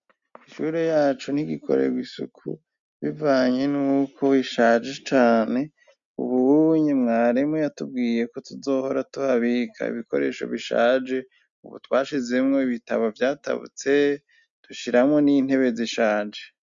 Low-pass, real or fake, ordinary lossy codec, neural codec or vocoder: 7.2 kHz; real; MP3, 64 kbps; none